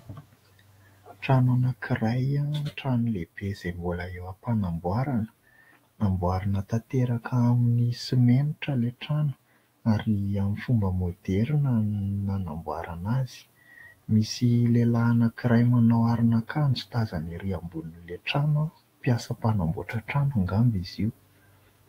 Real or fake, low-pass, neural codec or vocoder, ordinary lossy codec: fake; 19.8 kHz; autoencoder, 48 kHz, 128 numbers a frame, DAC-VAE, trained on Japanese speech; AAC, 48 kbps